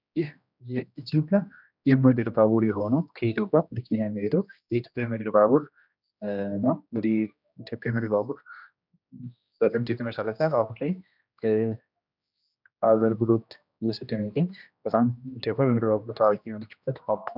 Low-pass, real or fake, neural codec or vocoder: 5.4 kHz; fake; codec, 16 kHz, 1 kbps, X-Codec, HuBERT features, trained on general audio